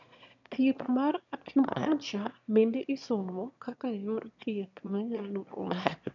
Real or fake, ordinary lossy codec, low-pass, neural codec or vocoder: fake; none; 7.2 kHz; autoencoder, 22.05 kHz, a latent of 192 numbers a frame, VITS, trained on one speaker